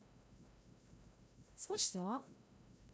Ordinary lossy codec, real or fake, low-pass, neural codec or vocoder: none; fake; none; codec, 16 kHz, 0.5 kbps, FreqCodec, larger model